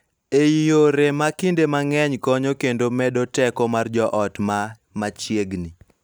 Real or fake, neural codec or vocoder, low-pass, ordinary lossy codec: real; none; none; none